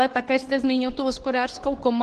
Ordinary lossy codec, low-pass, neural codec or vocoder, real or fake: Opus, 16 kbps; 10.8 kHz; codec, 24 kHz, 0.9 kbps, WavTokenizer, medium speech release version 2; fake